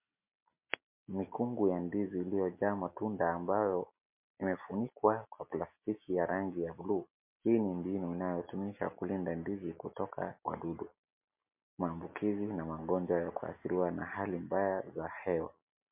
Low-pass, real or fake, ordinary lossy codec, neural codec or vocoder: 3.6 kHz; real; MP3, 32 kbps; none